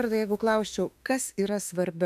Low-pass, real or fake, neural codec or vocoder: 14.4 kHz; fake; autoencoder, 48 kHz, 32 numbers a frame, DAC-VAE, trained on Japanese speech